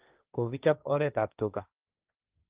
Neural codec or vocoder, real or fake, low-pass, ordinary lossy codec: codec, 16 kHz, 1.1 kbps, Voila-Tokenizer; fake; 3.6 kHz; Opus, 32 kbps